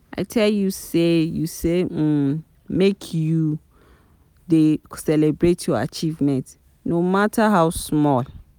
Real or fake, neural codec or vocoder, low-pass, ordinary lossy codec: real; none; none; none